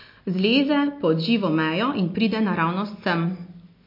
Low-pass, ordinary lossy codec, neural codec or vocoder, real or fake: 5.4 kHz; MP3, 32 kbps; none; real